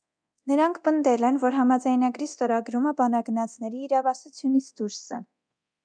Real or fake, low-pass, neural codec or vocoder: fake; 9.9 kHz; codec, 24 kHz, 0.9 kbps, DualCodec